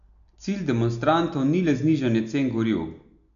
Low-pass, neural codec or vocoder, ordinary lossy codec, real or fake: 7.2 kHz; none; none; real